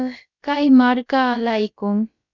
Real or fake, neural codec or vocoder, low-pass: fake; codec, 16 kHz, about 1 kbps, DyCAST, with the encoder's durations; 7.2 kHz